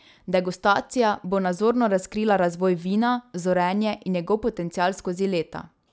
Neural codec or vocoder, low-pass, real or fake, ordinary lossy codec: none; none; real; none